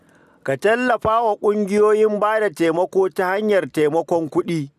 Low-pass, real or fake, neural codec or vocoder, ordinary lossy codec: 14.4 kHz; real; none; none